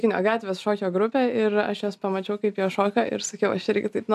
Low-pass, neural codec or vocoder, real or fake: 14.4 kHz; none; real